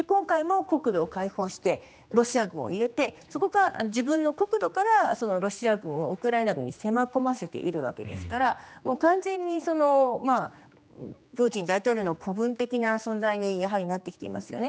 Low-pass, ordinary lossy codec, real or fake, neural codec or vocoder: none; none; fake; codec, 16 kHz, 2 kbps, X-Codec, HuBERT features, trained on general audio